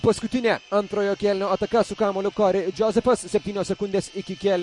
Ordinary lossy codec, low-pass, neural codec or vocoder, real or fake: MP3, 48 kbps; 10.8 kHz; none; real